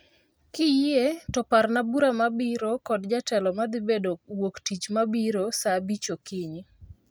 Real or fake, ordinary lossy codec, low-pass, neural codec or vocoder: real; none; none; none